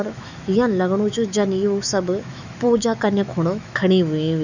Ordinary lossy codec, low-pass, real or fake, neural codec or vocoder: none; 7.2 kHz; fake; autoencoder, 48 kHz, 128 numbers a frame, DAC-VAE, trained on Japanese speech